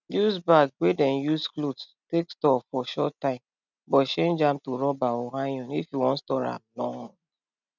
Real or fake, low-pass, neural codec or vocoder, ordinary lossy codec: real; 7.2 kHz; none; none